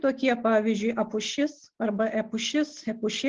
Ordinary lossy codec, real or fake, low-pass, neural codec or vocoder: Opus, 16 kbps; real; 7.2 kHz; none